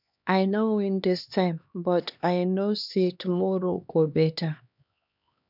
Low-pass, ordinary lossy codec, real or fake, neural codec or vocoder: 5.4 kHz; none; fake; codec, 16 kHz, 2 kbps, X-Codec, HuBERT features, trained on LibriSpeech